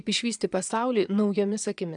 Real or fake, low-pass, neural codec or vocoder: fake; 9.9 kHz; vocoder, 22.05 kHz, 80 mel bands, Vocos